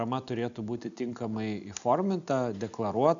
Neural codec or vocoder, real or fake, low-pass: none; real; 7.2 kHz